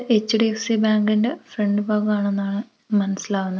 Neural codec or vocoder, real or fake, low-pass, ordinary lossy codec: none; real; none; none